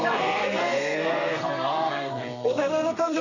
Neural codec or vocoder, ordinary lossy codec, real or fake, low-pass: codec, 32 kHz, 1.9 kbps, SNAC; none; fake; 7.2 kHz